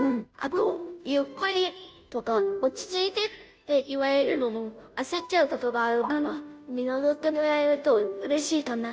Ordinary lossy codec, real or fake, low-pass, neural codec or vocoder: none; fake; none; codec, 16 kHz, 0.5 kbps, FunCodec, trained on Chinese and English, 25 frames a second